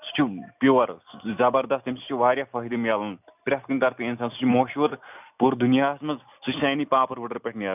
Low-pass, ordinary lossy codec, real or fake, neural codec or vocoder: 3.6 kHz; none; real; none